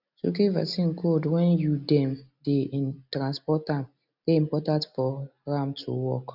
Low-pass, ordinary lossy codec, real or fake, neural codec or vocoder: 5.4 kHz; none; real; none